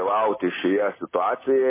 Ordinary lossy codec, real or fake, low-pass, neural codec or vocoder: MP3, 16 kbps; real; 3.6 kHz; none